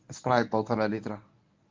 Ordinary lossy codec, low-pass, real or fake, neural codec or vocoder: Opus, 32 kbps; 7.2 kHz; fake; codec, 24 kHz, 0.9 kbps, WavTokenizer, medium speech release version 1